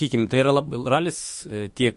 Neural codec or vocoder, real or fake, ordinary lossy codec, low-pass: autoencoder, 48 kHz, 32 numbers a frame, DAC-VAE, trained on Japanese speech; fake; MP3, 48 kbps; 14.4 kHz